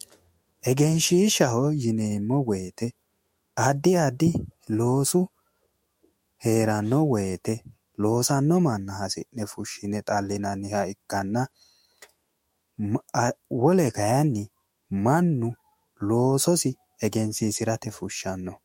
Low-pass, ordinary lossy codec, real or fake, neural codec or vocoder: 19.8 kHz; MP3, 64 kbps; fake; autoencoder, 48 kHz, 128 numbers a frame, DAC-VAE, trained on Japanese speech